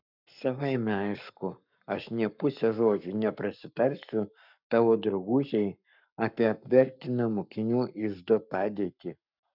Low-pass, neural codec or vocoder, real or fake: 5.4 kHz; codec, 44.1 kHz, 7.8 kbps, Pupu-Codec; fake